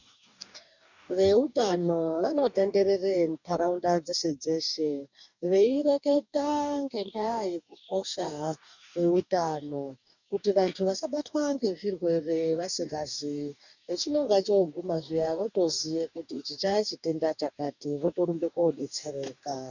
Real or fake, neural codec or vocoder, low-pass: fake; codec, 44.1 kHz, 2.6 kbps, DAC; 7.2 kHz